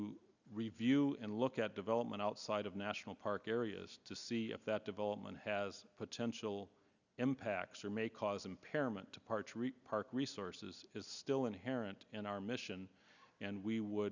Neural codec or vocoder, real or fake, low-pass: none; real; 7.2 kHz